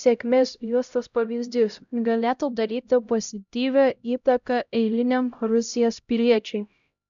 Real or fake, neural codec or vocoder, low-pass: fake; codec, 16 kHz, 0.5 kbps, X-Codec, HuBERT features, trained on LibriSpeech; 7.2 kHz